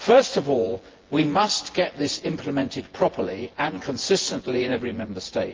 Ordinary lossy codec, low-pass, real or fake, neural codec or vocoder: Opus, 16 kbps; 7.2 kHz; fake; vocoder, 24 kHz, 100 mel bands, Vocos